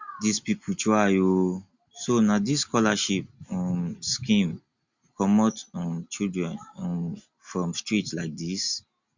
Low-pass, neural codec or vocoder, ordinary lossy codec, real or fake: 7.2 kHz; none; Opus, 64 kbps; real